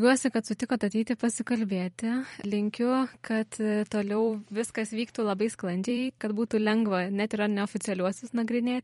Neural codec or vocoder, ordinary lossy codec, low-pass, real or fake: vocoder, 44.1 kHz, 128 mel bands every 256 samples, BigVGAN v2; MP3, 48 kbps; 19.8 kHz; fake